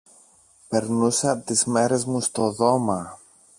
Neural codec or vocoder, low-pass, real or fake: none; 10.8 kHz; real